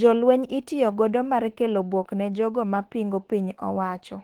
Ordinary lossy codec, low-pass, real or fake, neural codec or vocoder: Opus, 16 kbps; 19.8 kHz; fake; autoencoder, 48 kHz, 32 numbers a frame, DAC-VAE, trained on Japanese speech